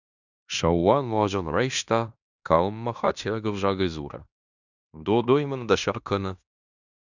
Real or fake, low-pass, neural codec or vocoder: fake; 7.2 kHz; codec, 16 kHz in and 24 kHz out, 0.9 kbps, LongCat-Audio-Codec, fine tuned four codebook decoder